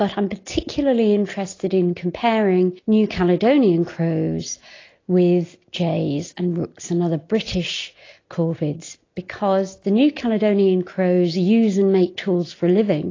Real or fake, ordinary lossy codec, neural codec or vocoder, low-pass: real; AAC, 32 kbps; none; 7.2 kHz